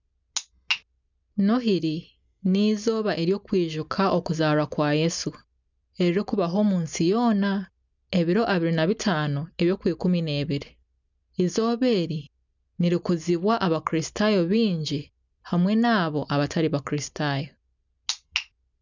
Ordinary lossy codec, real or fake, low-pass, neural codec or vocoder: none; real; 7.2 kHz; none